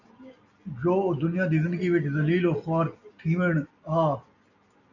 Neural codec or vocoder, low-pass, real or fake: none; 7.2 kHz; real